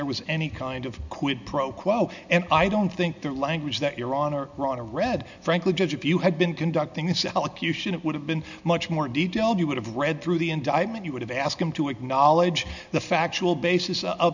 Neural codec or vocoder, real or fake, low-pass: none; real; 7.2 kHz